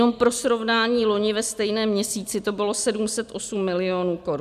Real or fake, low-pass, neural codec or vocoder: real; 14.4 kHz; none